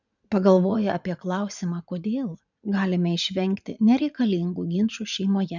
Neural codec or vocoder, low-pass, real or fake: vocoder, 44.1 kHz, 80 mel bands, Vocos; 7.2 kHz; fake